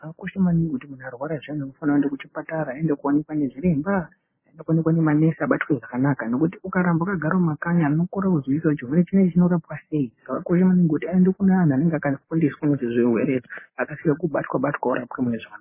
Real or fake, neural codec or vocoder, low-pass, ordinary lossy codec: real; none; 3.6 kHz; MP3, 16 kbps